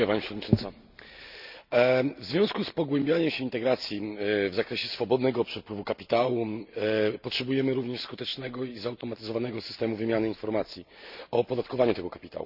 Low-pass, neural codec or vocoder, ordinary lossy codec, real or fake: 5.4 kHz; none; none; real